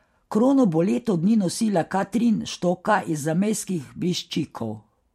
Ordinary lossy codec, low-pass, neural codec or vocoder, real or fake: MP3, 64 kbps; 19.8 kHz; vocoder, 44.1 kHz, 128 mel bands every 512 samples, BigVGAN v2; fake